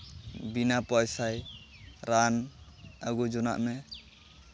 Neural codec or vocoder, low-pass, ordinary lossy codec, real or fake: none; none; none; real